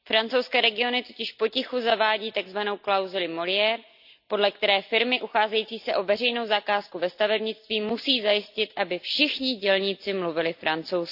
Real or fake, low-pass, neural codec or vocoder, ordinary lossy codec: real; 5.4 kHz; none; AAC, 48 kbps